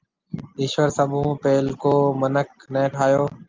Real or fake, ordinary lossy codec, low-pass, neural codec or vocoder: real; Opus, 32 kbps; 7.2 kHz; none